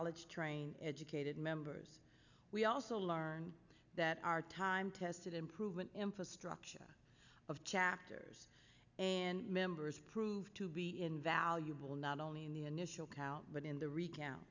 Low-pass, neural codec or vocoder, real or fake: 7.2 kHz; none; real